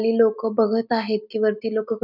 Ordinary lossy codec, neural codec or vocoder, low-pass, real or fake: none; none; 5.4 kHz; real